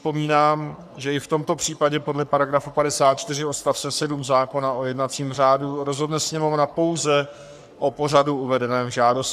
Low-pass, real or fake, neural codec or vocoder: 14.4 kHz; fake; codec, 44.1 kHz, 3.4 kbps, Pupu-Codec